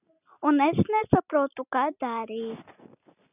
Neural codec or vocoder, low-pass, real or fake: none; 3.6 kHz; real